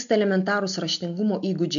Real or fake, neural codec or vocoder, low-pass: real; none; 7.2 kHz